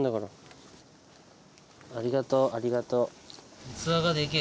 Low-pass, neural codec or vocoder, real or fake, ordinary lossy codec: none; none; real; none